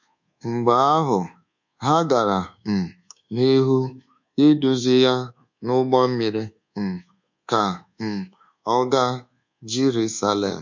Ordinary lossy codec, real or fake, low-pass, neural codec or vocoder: MP3, 48 kbps; fake; 7.2 kHz; codec, 24 kHz, 1.2 kbps, DualCodec